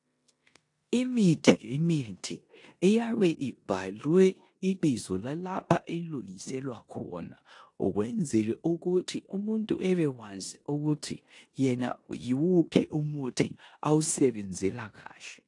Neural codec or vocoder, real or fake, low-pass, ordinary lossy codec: codec, 16 kHz in and 24 kHz out, 0.9 kbps, LongCat-Audio-Codec, four codebook decoder; fake; 10.8 kHz; AAC, 48 kbps